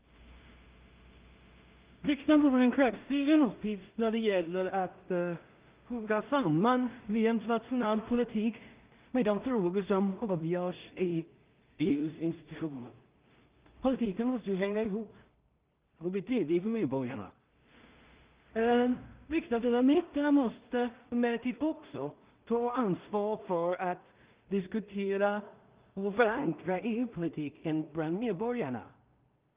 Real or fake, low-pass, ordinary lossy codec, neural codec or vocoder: fake; 3.6 kHz; Opus, 24 kbps; codec, 16 kHz in and 24 kHz out, 0.4 kbps, LongCat-Audio-Codec, two codebook decoder